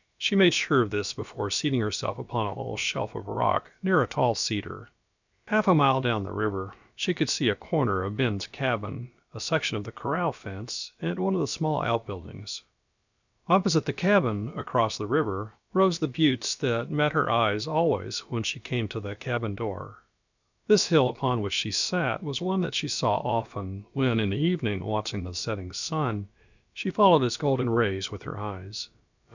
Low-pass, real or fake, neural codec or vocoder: 7.2 kHz; fake; codec, 16 kHz, about 1 kbps, DyCAST, with the encoder's durations